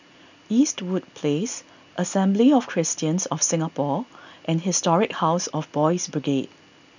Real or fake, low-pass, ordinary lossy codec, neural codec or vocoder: real; 7.2 kHz; none; none